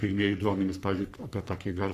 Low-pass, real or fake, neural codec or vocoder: 14.4 kHz; fake; codec, 44.1 kHz, 3.4 kbps, Pupu-Codec